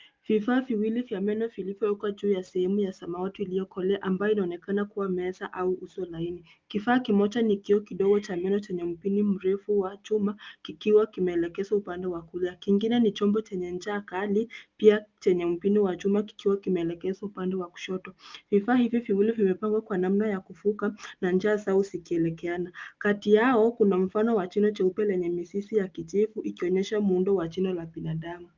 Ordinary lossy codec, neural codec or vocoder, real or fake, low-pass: Opus, 32 kbps; none; real; 7.2 kHz